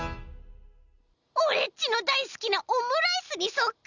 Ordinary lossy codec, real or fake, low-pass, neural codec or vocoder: none; real; 7.2 kHz; none